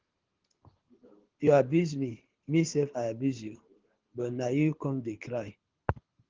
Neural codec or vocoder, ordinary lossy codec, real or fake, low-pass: codec, 24 kHz, 6 kbps, HILCodec; Opus, 16 kbps; fake; 7.2 kHz